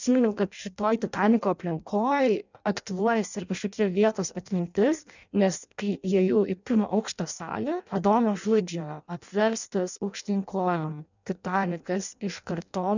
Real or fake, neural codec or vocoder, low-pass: fake; codec, 16 kHz in and 24 kHz out, 0.6 kbps, FireRedTTS-2 codec; 7.2 kHz